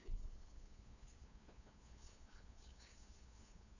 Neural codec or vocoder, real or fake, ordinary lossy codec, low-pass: codec, 16 kHz, 2 kbps, FunCodec, trained on LibriTTS, 25 frames a second; fake; none; 7.2 kHz